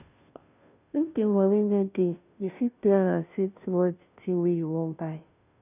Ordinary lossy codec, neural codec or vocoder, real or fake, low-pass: none; codec, 16 kHz, 0.5 kbps, FunCodec, trained on Chinese and English, 25 frames a second; fake; 3.6 kHz